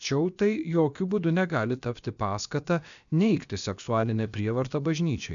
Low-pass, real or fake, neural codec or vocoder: 7.2 kHz; fake; codec, 16 kHz, about 1 kbps, DyCAST, with the encoder's durations